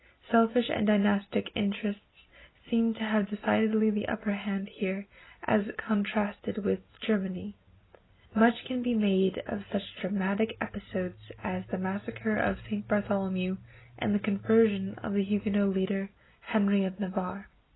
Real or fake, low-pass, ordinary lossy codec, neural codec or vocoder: real; 7.2 kHz; AAC, 16 kbps; none